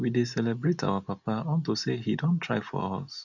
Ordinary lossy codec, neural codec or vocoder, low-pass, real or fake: none; none; 7.2 kHz; real